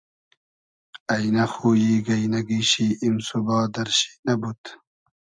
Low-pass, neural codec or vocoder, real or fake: 9.9 kHz; none; real